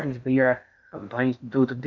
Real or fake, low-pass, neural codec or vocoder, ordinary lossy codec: fake; 7.2 kHz; codec, 16 kHz in and 24 kHz out, 0.6 kbps, FocalCodec, streaming, 4096 codes; none